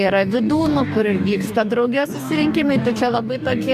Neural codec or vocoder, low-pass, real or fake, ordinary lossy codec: codec, 44.1 kHz, 2.6 kbps, DAC; 14.4 kHz; fake; AAC, 96 kbps